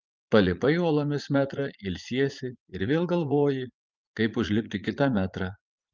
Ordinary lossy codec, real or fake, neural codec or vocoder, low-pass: Opus, 32 kbps; fake; vocoder, 44.1 kHz, 80 mel bands, Vocos; 7.2 kHz